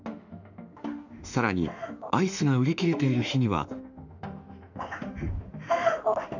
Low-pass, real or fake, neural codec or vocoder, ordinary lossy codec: 7.2 kHz; fake; autoencoder, 48 kHz, 32 numbers a frame, DAC-VAE, trained on Japanese speech; none